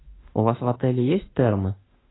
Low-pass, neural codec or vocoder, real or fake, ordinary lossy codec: 7.2 kHz; autoencoder, 48 kHz, 32 numbers a frame, DAC-VAE, trained on Japanese speech; fake; AAC, 16 kbps